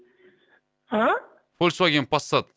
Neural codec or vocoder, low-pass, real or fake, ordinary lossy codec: none; none; real; none